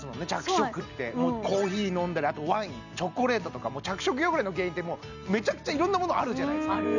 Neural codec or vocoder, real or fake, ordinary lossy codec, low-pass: none; real; none; 7.2 kHz